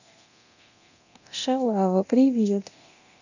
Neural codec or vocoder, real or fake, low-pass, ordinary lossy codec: codec, 24 kHz, 0.9 kbps, DualCodec; fake; 7.2 kHz; none